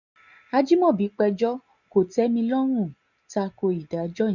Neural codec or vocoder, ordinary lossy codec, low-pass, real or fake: none; none; 7.2 kHz; real